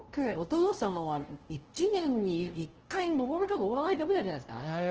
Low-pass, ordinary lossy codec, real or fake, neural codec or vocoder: 7.2 kHz; Opus, 16 kbps; fake; codec, 16 kHz, 0.5 kbps, FunCodec, trained on LibriTTS, 25 frames a second